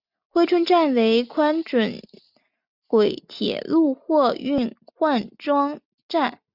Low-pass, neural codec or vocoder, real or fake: 5.4 kHz; none; real